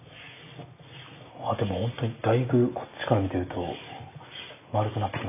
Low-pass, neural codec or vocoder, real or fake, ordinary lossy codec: 3.6 kHz; none; real; AAC, 24 kbps